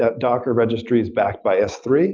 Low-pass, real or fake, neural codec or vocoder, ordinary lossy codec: 7.2 kHz; real; none; Opus, 24 kbps